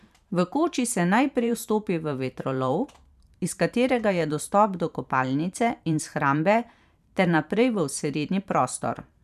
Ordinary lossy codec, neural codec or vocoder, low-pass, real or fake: none; vocoder, 44.1 kHz, 128 mel bands every 512 samples, BigVGAN v2; 14.4 kHz; fake